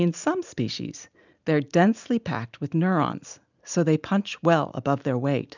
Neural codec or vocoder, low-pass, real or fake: none; 7.2 kHz; real